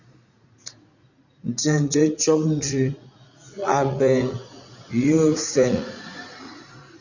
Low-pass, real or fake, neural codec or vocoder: 7.2 kHz; fake; vocoder, 44.1 kHz, 80 mel bands, Vocos